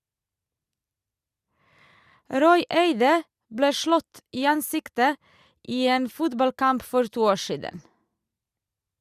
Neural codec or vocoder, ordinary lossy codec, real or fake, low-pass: none; Opus, 64 kbps; real; 14.4 kHz